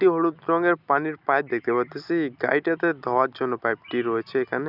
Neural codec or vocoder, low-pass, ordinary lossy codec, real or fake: none; 5.4 kHz; none; real